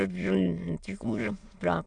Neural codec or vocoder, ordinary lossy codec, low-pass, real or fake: autoencoder, 22.05 kHz, a latent of 192 numbers a frame, VITS, trained on many speakers; AAC, 64 kbps; 9.9 kHz; fake